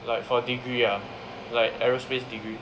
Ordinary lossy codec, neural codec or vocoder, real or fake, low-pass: none; none; real; none